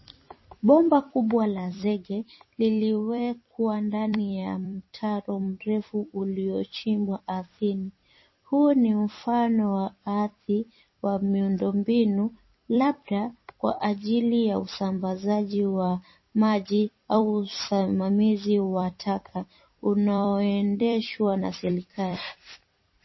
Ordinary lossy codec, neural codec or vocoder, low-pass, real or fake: MP3, 24 kbps; vocoder, 44.1 kHz, 128 mel bands every 256 samples, BigVGAN v2; 7.2 kHz; fake